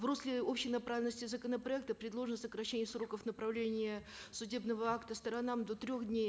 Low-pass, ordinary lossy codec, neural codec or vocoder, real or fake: none; none; none; real